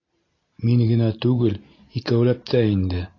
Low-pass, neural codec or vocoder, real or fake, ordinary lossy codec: 7.2 kHz; none; real; AAC, 32 kbps